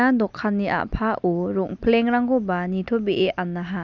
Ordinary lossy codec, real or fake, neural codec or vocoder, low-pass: none; real; none; 7.2 kHz